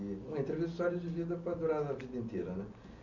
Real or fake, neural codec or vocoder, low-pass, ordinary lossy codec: real; none; 7.2 kHz; none